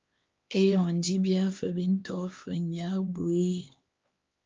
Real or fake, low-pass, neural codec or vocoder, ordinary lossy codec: fake; 7.2 kHz; codec, 16 kHz, 2 kbps, X-Codec, HuBERT features, trained on LibriSpeech; Opus, 32 kbps